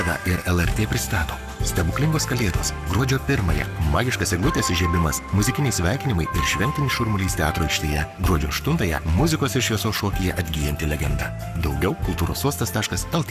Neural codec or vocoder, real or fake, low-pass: codec, 44.1 kHz, 7.8 kbps, Pupu-Codec; fake; 14.4 kHz